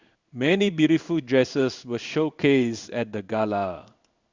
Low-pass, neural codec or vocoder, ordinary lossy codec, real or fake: 7.2 kHz; codec, 16 kHz in and 24 kHz out, 1 kbps, XY-Tokenizer; Opus, 64 kbps; fake